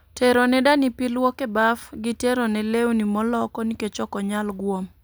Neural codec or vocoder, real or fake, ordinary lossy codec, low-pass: none; real; none; none